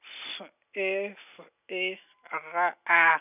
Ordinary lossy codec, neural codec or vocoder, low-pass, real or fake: none; none; 3.6 kHz; real